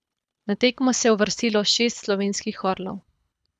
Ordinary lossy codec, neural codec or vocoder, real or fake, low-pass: none; codec, 24 kHz, 6 kbps, HILCodec; fake; none